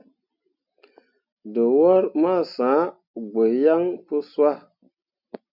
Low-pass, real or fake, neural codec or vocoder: 5.4 kHz; real; none